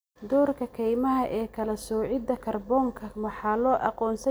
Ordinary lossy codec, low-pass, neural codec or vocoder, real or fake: none; none; none; real